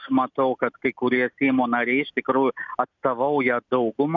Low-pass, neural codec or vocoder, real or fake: 7.2 kHz; none; real